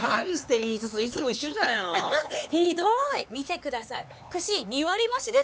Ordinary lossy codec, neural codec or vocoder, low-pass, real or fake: none; codec, 16 kHz, 4 kbps, X-Codec, HuBERT features, trained on LibriSpeech; none; fake